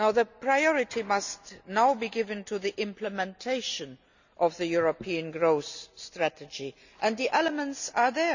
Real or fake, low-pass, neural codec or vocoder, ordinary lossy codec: real; 7.2 kHz; none; none